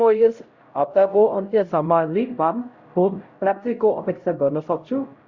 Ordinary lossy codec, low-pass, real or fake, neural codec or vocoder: Opus, 64 kbps; 7.2 kHz; fake; codec, 16 kHz, 0.5 kbps, X-Codec, HuBERT features, trained on LibriSpeech